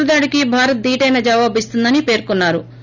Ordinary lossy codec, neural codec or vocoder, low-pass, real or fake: none; none; 7.2 kHz; real